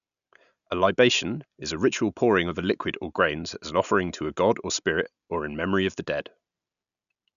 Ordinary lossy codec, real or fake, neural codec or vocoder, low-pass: none; real; none; 7.2 kHz